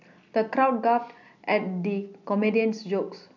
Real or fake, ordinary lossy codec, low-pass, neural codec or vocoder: real; none; 7.2 kHz; none